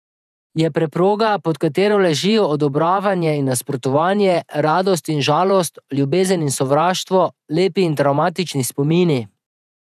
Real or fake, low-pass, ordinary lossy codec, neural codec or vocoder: fake; 14.4 kHz; none; vocoder, 48 kHz, 128 mel bands, Vocos